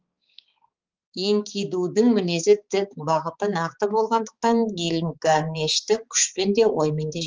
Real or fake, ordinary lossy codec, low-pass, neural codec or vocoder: fake; Opus, 24 kbps; 7.2 kHz; codec, 16 kHz, 4 kbps, X-Codec, HuBERT features, trained on balanced general audio